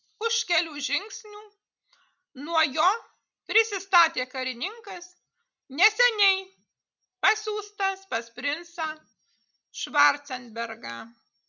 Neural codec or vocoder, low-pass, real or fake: none; 7.2 kHz; real